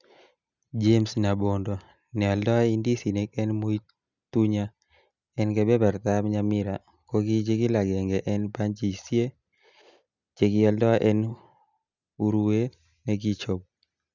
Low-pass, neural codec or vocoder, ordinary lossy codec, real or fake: 7.2 kHz; none; none; real